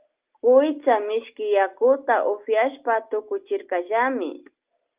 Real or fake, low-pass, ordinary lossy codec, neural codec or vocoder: real; 3.6 kHz; Opus, 32 kbps; none